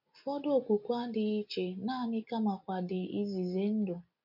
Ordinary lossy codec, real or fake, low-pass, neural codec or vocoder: MP3, 48 kbps; real; 5.4 kHz; none